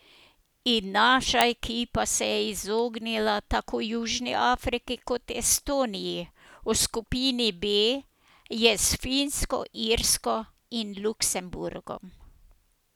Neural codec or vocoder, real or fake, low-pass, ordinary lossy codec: none; real; none; none